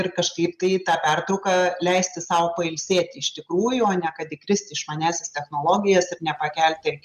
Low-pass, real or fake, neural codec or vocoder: 14.4 kHz; real; none